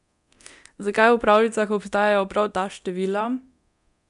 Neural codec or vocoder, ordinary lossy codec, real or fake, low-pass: codec, 24 kHz, 0.9 kbps, DualCodec; none; fake; 10.8 kHz